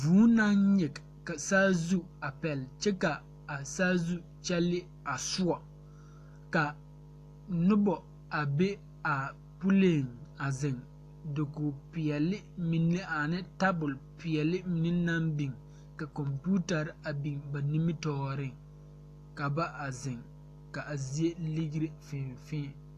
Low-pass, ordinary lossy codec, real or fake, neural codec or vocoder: 14.4 kHz; AAC, 64 kbps; real; none